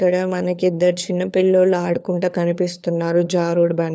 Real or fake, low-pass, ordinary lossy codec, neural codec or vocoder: fake; none; none; codec, 16 kHz, 4 kbps, FunCodec, trained on LibriTTS, 50 frames a second